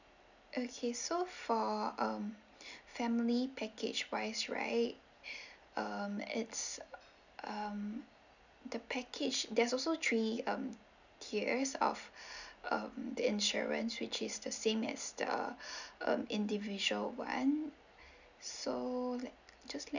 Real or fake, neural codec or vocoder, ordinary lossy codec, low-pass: real; none; none; 7.2 kHz